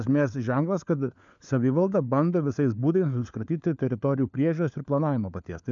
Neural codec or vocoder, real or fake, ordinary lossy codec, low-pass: codec, 16 kHz, 4 kbps, FunCodec, trained on LibriTTS, 50 frames a second; fake; MP3, 96 kbps; 7.2 kHz